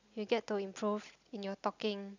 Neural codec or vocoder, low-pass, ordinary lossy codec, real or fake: none; 7.2 kHz; none; real